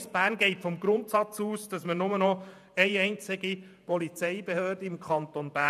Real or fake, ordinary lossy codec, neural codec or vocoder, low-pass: fake; none; vocoder, 48 kHz, 128 mel bands, Vocos; 14.4 kHz